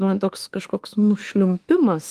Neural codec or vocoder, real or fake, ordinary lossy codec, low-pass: autoencoder, 48 kHz, 32 numbers a frame, DAC-VAE, trained on Japanese speech; fake; Opus, 24 kbps; 14.4 kHz